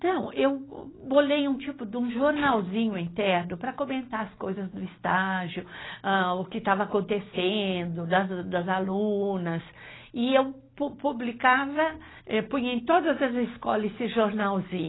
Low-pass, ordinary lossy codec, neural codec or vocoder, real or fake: 7.2 kHz; AAC, 16 kbps; none; real